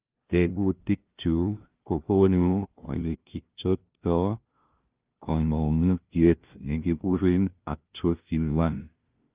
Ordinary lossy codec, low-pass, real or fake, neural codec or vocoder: Opus, 32 kbps; 3.6 kHz; fake; codec, 16 kHz, 0.5 kbps, FunCodec, trained on LibriTTS, 25 frames a second